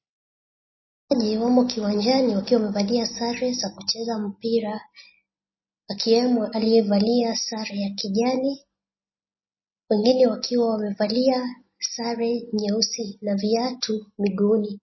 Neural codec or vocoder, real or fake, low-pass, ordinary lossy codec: none; real; 7.2 kHz; MP3, 24 kbps